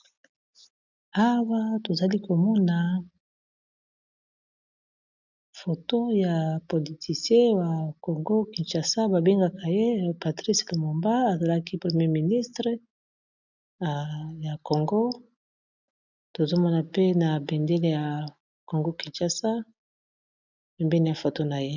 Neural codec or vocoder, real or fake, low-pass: none; real; 7.2 kHz